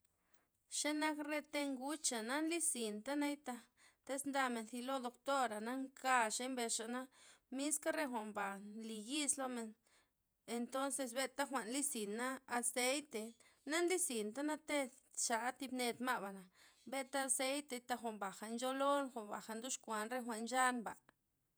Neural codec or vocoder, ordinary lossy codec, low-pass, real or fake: vocoder, 48 kHz, 128 mel bands, Vocos; none; none; fake